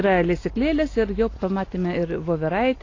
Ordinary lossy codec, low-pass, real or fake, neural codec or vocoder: AAC, 32 kbps; 7.2 kHz; real; none